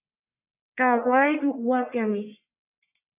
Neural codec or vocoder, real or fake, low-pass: codec, 44.1 kHz, 1.7 kbps, Pupu-Codec; fake; 3.6 kHz